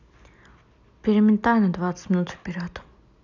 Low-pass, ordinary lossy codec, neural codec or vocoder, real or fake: 7.2 kHz; none; none; real